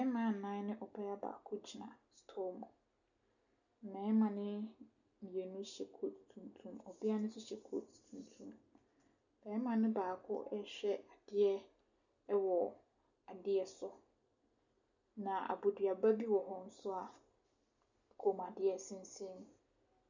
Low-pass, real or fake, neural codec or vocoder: 7.2 kHz; real; none